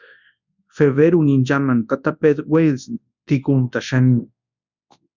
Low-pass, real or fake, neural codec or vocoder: 7.2 kHz; fake; codec, 24 kHz, 0.9 kbps, WavTokenizer, large speech release